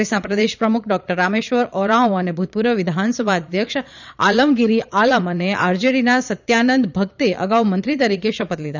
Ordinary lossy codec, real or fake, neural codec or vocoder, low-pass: none; fake; vocoder, 22.05 kHz, 80 mel bands, Vocos; 7.2 kHz